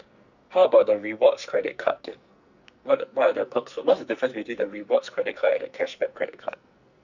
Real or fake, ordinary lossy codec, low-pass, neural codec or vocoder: fake; none; 7.2 kHz; codec, 44.1 kHz, 2.6 kbps, SNAC